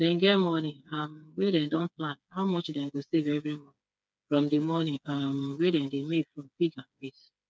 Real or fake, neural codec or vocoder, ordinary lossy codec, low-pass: fake; codec, 16 kHz, 4 kbps, FreqCodec, smaller model; none; none